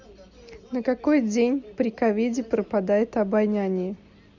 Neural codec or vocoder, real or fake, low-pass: none; real; 7.2 kHz